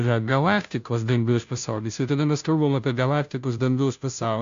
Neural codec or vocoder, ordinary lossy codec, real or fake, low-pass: codec, 16 kHz, 0.5 kbps, FunCodec, trained on Chinese and English, 25 frames a second; AAC, 64 kbps; fake; 7.2 kHz